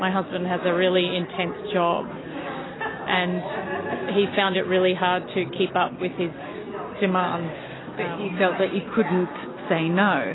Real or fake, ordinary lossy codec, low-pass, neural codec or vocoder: real; AAC, 16 kbps; 7.2 kHz; none